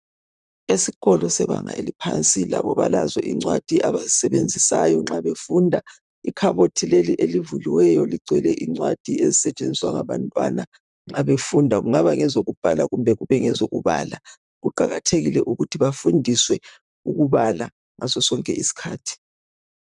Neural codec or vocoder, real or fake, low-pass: vocoder, 44.1 kHz, 128 mel bands, Pupu-Vocoder; fake; 10.8 kHz